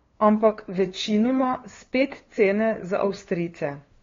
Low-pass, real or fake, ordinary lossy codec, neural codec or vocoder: 7.2 kHz; fake; AAC, 32 kbps; codec, 16 kHz, 2 kbps, FunCodec, trained on LibriTTS, 25 frames a second